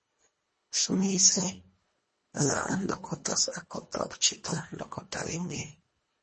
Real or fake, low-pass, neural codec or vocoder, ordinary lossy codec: fake; 10.8 kHz; codec, 24 kHz, 1.5 kbps, HILCodec; MP3, 32 kbps